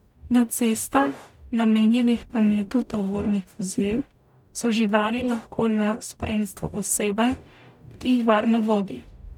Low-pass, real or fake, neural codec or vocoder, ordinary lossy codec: 19.8 kHz; fake; codec, 44.1 kHz, 0.9 kbps, DAC; none